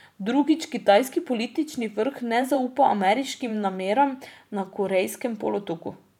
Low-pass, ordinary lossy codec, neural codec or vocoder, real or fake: 19.8 kHz; none; vocoder, 44.1 kHz, 128 mel bands every 512 samples, BigVGAN v2; fake